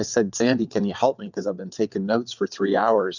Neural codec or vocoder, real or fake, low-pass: vocoder, 22.05 kHz, 80 mel bands, WaveNeXt; fake; 7.2 kHz